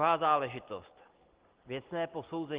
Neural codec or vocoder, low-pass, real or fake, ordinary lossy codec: none; 3.6 kHz; real; Opus, 16 kbps